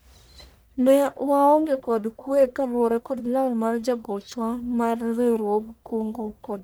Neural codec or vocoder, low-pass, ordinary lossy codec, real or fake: codec, 44.1 kHz, 1.7 kbps, Pupu-Codec; none; none; fake